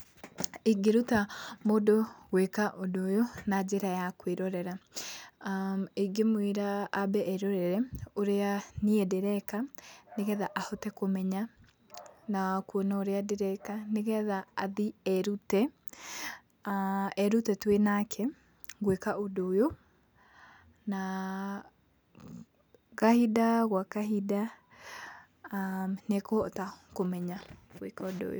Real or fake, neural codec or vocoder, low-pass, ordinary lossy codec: real; none; none; none